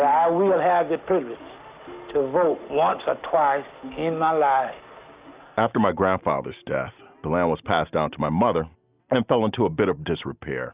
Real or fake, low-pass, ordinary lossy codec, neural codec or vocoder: real; 3.6 kHz; Opus, 64 kbps; none